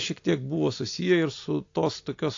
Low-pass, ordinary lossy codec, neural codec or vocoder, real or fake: 7.2 kHz; AAC, 48 kbps; none; real